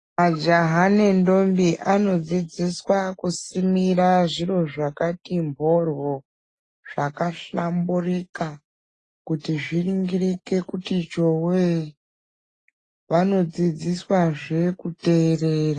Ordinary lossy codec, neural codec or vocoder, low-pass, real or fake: AAC, 32 kbps; none; 10.8 kHz; real